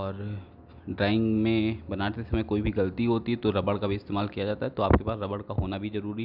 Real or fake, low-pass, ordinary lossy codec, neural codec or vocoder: real; 5.4 kHz; none; none